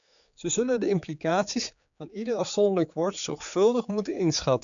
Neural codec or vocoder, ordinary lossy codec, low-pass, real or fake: codec, 16 kHz, 4 kbps, X-Codec, HuBERT features, trained on general audio; MP3, 96 kbps; 7.2 kHz; fake